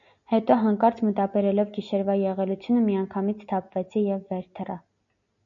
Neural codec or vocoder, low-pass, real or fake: none; 7.2 kHz; real